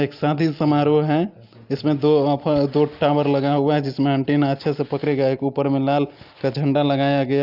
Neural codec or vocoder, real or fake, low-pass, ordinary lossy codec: none; real; 5.4 kHz; Opus, 24 kbps